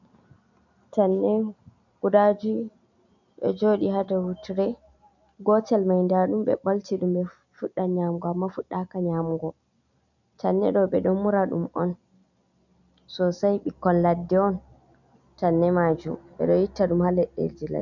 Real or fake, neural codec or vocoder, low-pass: real; none; 7.2 kHz